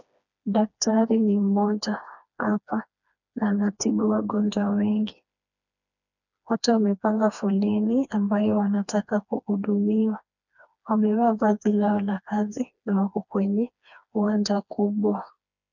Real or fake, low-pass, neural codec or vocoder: fake; 7.2 kHz; codec, 16 kHz, 2 kbps, FreqCodec, smaller model